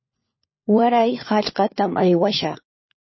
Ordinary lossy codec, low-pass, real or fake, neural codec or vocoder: MP3, 24 kbps; 7.2 kHz; fake; codec, 16 kHz, 4 kbps, FunCodec, trained on LibriTTS, 50 frames a second